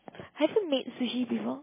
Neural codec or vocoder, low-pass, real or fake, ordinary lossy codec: none; 3.6 kHz; real; MP3, 16 kbps